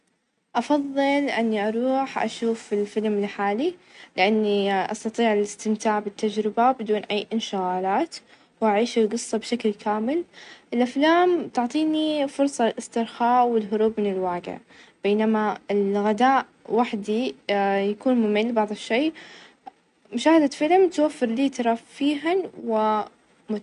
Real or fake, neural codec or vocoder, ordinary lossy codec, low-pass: real; none; none; 10.8 kHz